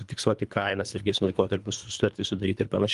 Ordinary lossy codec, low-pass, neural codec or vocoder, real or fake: Opus, 32 kbps; 10.8 kHz; codec, 24 kHz, 3 kbps, HILCodec; fake